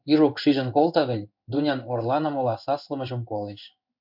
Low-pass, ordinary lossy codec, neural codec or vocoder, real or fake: 5.4 kHz; MP3, 48 kbps; codec, 16 kHz in and 24 kHz out, 1 kbps, XY-Tokenizer; fake